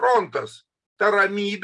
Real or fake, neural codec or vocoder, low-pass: real; none; 10.8 kHz